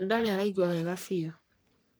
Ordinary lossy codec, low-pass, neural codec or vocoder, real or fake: none; none; codec, 44.1 kHz, 3.4 kbps, Pupu-Codec; fake